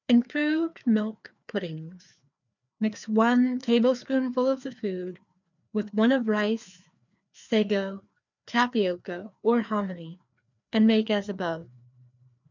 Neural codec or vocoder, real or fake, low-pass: codec, 16 kHz, 2 kbps, FreqCodec, larger model; fake; 7.2 kHz